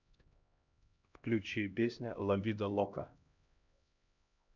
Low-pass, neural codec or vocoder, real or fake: 7.2 kHz; codec, 16 kHz, 1 kbps, X-Codec, HuBERT features, trained on LibriSpeech; fake